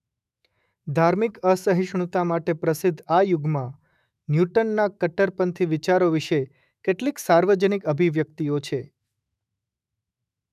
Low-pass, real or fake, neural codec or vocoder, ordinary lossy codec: 14.4 kHz; fake; autoencoder, 48 kHz, 128 numbers a frame, DAC-VAE, trained on Japanese speech; none